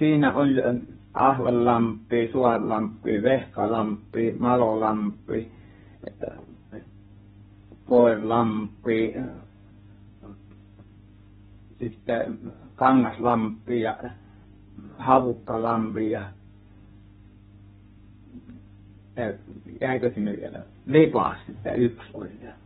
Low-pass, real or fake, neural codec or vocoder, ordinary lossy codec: 14.4 kHz; fake; codec, 32 kHz, 1.9 kbps, SNAC; AAC, 16 kbps